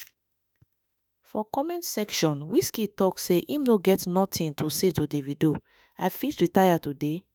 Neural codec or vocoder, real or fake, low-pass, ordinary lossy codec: autoencoder, 48 kHz, 32 numbers a frame, DAC-VAE, trained on Japanese speech; fake; none; none